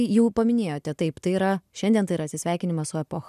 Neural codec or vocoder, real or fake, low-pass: none; real; 14.4 kHz